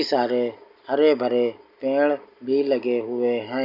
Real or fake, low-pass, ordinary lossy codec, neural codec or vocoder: real; 5.4 kHz; none; none